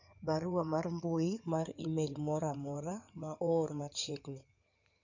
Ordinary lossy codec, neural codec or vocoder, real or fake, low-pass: none; codec, 16 kHz in and 24 kHz out, 2.2 kbps, FireRedTTS-2 codec; fake; 7.2 kHz